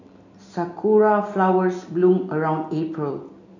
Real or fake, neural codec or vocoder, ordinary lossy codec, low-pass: real; none; none; 7.2 kHz